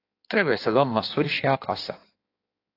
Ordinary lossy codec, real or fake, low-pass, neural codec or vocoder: AAC, 32 kbps; fake; 5.4 kHz; codec, 16 kHz in and 24 kHz out, 1.1 kbps, FireRedTTS-2 codec